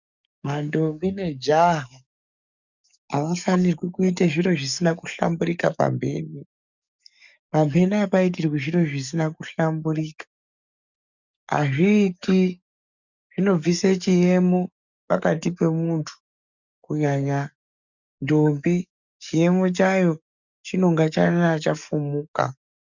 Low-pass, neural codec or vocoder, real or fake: 7.2 kHz; codec, 44.1 kHz, 7.8 kbps, DAC; fake